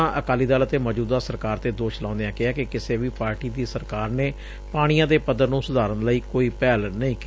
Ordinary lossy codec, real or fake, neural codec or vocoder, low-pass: none; real; none; none